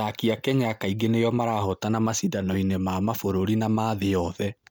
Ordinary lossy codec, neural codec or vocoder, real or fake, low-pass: none; none; real; none